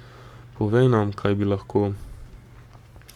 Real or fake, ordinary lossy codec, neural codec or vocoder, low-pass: fake; Opus, 64 kbps; codec, 44.1 kHz, 7.8 kbps, Pupu-Codec; 19.8 kHz